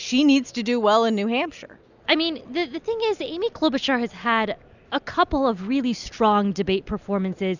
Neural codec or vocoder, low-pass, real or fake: none; 7.2 kHz; real